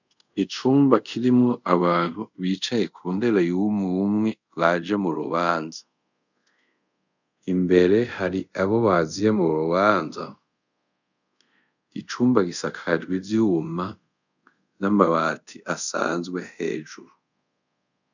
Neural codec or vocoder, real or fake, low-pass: codec, 24 kHz, 0.5 kbps, DualCodec; fake; 7.2 kHz